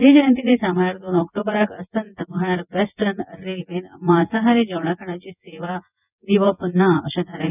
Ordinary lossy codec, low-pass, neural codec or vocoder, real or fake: none; 3.6 kHz; vocoder, 24 kHz, 100 mel bands, Vocos; fake